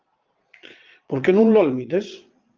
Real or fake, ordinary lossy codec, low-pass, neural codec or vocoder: fake; Opus, 32 kbps; 7.2 kHz; vocoder, 22.05 kHz, 80 mel bands, Vocos